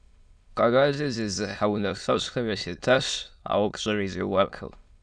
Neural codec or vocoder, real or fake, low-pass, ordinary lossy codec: autoencoder, 22.05 kHz, a latent of 192 numbers a frame, VITS, trained on many speakers; fake; 9.9 kHz; Opus, 64 kbps